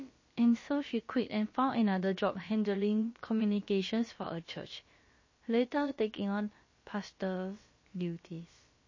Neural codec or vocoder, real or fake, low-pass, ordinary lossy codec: codec, 16 kHz, about 1 kbps, DyCAST, with the encoder's durations; fake; 7.2 kHz; MP3, 32 kbps